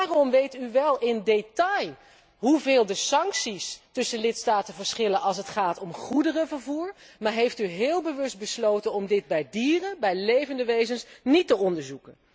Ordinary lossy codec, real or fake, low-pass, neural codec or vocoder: none; real; none; none